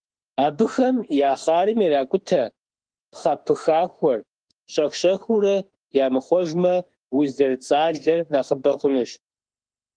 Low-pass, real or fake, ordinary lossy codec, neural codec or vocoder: 9.9 kHz; fake; Opus, 24 kbps; autoencoder, 48 kHz, 32 numbers a frame, DAC-VAE, trained on Japanese speech